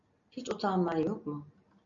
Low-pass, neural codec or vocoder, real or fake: 7.2 kHz; none; real